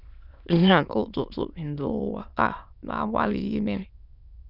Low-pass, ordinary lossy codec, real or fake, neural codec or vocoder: 5.4 kHz; none; fake; autoencoder, 22.05 kHz, a latent of 192 numbers a frame, VITS, trained on many speakers